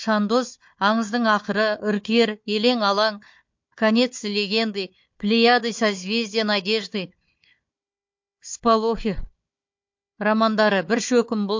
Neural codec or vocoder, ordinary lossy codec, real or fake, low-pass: codec, 16 kHz, 4 kbps, FunCodec, trained on Chinese and English, 50 frames a second; MP3, 48 kbps; fake; 7.2 kHz